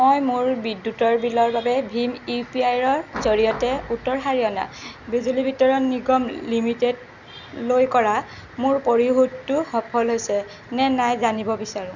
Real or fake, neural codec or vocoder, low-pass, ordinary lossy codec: real; none; 7.2 kHz; none